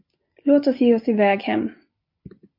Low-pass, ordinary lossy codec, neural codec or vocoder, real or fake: 5.4 kHz; MP3, 32 kbps; none; real